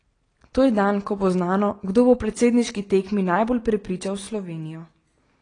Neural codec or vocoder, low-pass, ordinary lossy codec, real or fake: none; 9.9 kHz; AAC, 32 kbps; real